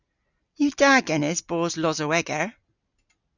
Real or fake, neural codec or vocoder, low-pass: real; none; 7.2 kHz